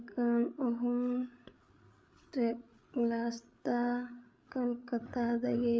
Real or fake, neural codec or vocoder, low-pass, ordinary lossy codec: fake; codec, 16 kHz, 8 kbps, FreqCodec, larger model; none; none